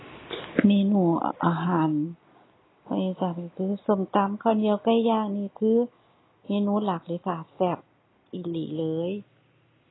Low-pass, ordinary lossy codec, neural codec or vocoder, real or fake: 7.2 kHz; AAC, 16 kbps; none; real